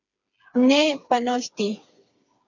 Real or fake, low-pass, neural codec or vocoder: fake; 7.2 kHz; codec, 16 kHz, 4 kbps, FreqCodec, smaller model